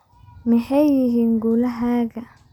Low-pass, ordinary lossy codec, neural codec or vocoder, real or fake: 19.8 kHz; none; none; real